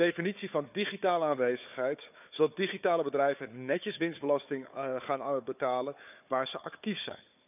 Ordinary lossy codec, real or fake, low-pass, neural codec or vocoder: none; fake; 3.6 kHz; codec, 16 kHz, 4 kbps, FunCodec, trained on Chinese and English, 50 frames a second